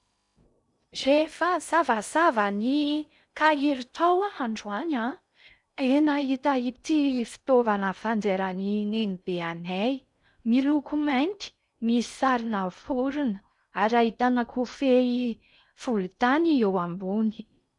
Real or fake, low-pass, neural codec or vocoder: fake; 10.8 kHz; codec, 16 kHz in and 24 kHz out, 0.6 kbps, FocalCodec, streaming, 2048 codes